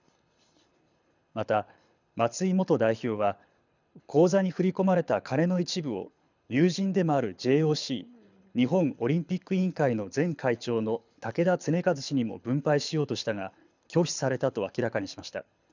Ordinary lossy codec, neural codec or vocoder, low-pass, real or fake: none; codec, 24 kHz, 6 kbps, HILCodec; 7.2 kHz; fake